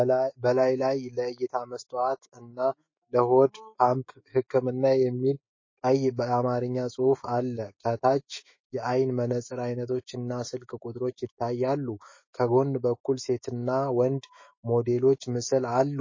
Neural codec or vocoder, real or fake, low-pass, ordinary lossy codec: autoencoder, 48 kHz, 128 numbers a frame, DAC-VAE, trained on Japanese speech; fake; 7.2 kHz; MP3, 32 kbps